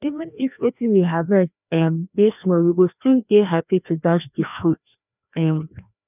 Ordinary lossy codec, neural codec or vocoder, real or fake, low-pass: none; codec, 16 kHz, 1 kbps, FreqCodec, larger model; fake; 3.6 kHz